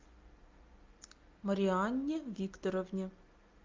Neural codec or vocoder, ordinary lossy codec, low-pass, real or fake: none; Opus, 24 kbps; 7.2 kHz; real